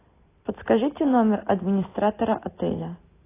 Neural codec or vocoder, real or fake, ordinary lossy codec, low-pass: none; real; AAC, 16 kbps; 3.6 kHz